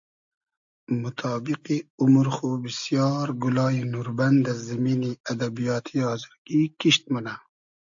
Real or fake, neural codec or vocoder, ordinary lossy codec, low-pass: real; none; AAC, 64 kbps; 7.2 kHz